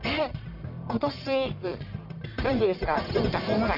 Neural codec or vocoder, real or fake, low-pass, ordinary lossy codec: codec, 44.1 kHz, 1.7 kbps, Pupu-Codec; fake; 5.4 kHz; none